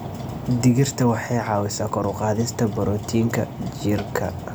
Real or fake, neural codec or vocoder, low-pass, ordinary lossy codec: real; none; none; none